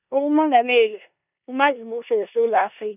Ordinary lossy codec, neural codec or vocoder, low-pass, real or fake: none; codec, 16 kHz in and 24 kHz out, 0.9 kbps, LongCat-Audio-Codec, four codebook decoder; 3.6 kHz; fake